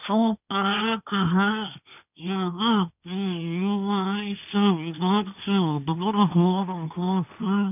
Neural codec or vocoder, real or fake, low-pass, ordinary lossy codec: codec, 16 kHz, 1.1 kbps, Voila-Tokenizer; fake; 3.6 kHz; none